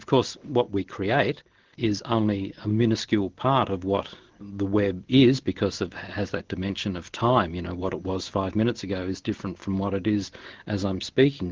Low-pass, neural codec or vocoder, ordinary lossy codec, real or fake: 7.2 kHz; none; Opus, 16 kbps; real